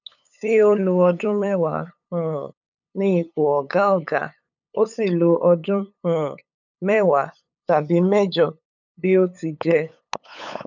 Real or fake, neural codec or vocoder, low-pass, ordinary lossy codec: fake; codec, 16 kHz, 8 kbps, FunCodec, trained on LibriTTS, 25 frames a second; 7.2 kHz; none